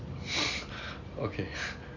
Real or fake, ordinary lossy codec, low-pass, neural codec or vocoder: real; none; 7.2 kHz; none